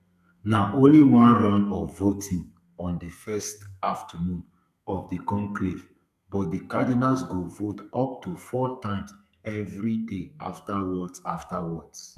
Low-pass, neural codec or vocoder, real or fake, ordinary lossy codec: 14.4 kHz; codec, 32 kHz, 1.9 kbps, SNAC; fake; none